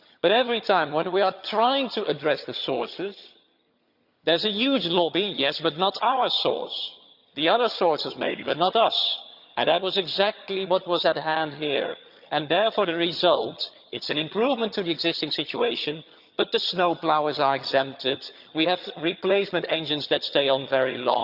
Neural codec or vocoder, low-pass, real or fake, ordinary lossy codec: vocoder, 22.05 kHz, 80 mel bands, HiFi-GAN; 5.4 kHz; fake; Opus, 64 kbps